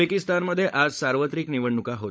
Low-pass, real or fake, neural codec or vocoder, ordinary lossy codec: none; fake; codec, 16 kHz, 16 kbps, FunCodec, trained on LibriTTS, 50 frames a second; none